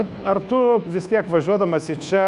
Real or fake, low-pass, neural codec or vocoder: fake; 10.8 kHz; codec, 24 kHz, 1.2 kbps, DualCodec